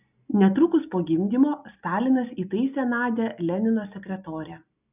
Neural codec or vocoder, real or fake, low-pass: none; real; 3.6 kHz